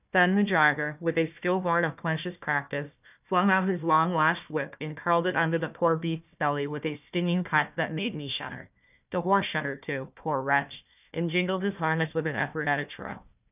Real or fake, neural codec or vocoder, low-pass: fake; codec, 16 kHz, 1 kbps, FunCodec, trained on Chinese and English, 50 frames a second; 3.6 kHz